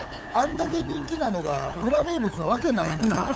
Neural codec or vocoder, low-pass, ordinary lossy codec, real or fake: codec, 16 kHz, 8 kbps, FunCodec, trained on LibriTTS, 25 frames a second; none; none; fake